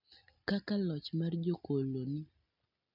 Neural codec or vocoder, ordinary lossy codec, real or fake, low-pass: none; none; real; 5.4 kHz